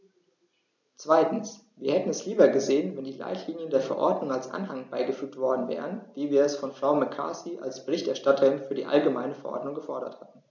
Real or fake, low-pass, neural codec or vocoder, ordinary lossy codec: real; 7.2 kHz; none; none